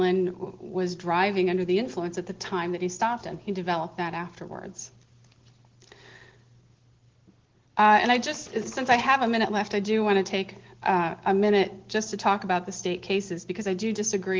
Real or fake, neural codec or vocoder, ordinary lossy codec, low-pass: real; none; Opus, 16 kbps; 7.2 kHz